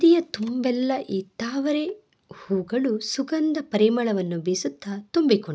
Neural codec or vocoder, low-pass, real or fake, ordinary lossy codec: none; none; real; none